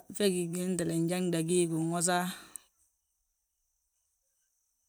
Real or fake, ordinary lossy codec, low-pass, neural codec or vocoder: fake; none; none; vocoder, 44.1 kHz, 128 mel bands every 512 samples, BigVGAN v2